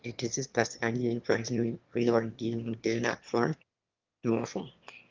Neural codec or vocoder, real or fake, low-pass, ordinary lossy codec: autoencoder, 22.05 kHz, a latent of 192 numbers a frame, VITS, trained on one speaker; fake; 7.2 kHz; Opus, 24 kbps